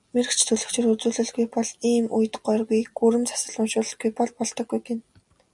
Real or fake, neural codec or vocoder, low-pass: real; none; 10.8 kHz